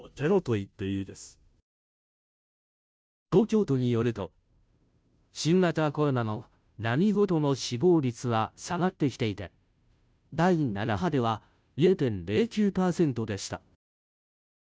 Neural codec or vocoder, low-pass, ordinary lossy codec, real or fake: codec, 16 kHz, 0.5 kbps, FunCodec, trained on Chinese and English, 25 frames a second; none; none; fake